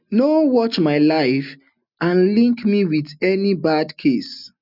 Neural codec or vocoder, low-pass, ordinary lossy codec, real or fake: none; 5.4 kHz; none; real